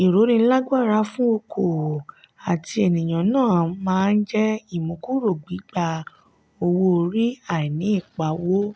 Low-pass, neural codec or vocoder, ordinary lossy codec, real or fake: none; none; none; real